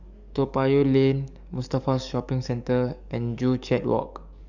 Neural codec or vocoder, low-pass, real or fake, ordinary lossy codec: codec, 44.1 kHz, 7.8 kbps, DAC; 7.2 kHz; fake; none